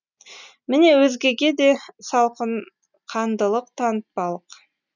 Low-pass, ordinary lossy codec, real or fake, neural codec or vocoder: 7.2 kHz; none; real; none